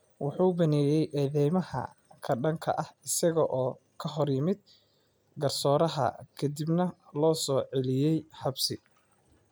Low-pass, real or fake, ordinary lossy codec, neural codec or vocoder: none; real; none; none